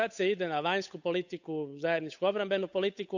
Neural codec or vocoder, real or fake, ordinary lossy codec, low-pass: codec, 16 kHz, 8 kbps, FunCodec, trained on Chinese and English, 25 frames a second; fake; none; 7.2 kHz